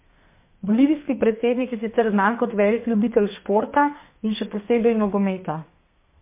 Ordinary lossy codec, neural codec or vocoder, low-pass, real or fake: MP3, 24 kbps; codec, 32 kHz, 1.9 kbps, SNAC; 3.6 kHz; fake